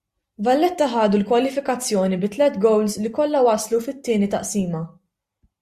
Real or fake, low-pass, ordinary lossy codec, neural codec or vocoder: real; 14.4 kHz; Opus, 64 kbps; none